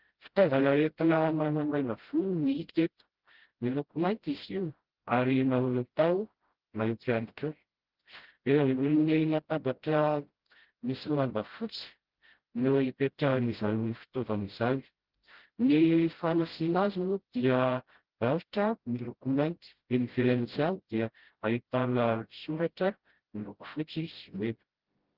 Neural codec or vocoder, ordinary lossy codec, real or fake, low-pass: codec, 16 kHz, 0.5 kbps, FreqCodec, smaller model; Opus, 16 kbps; fake; 5.4 kHz